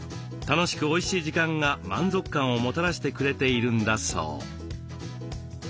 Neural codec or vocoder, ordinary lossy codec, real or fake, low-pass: none; none; real; none